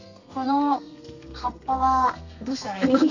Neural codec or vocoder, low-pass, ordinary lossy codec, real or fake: codec, 32 kHz, 1.9 kbps, SNAC; 7.2 kHz; none; fake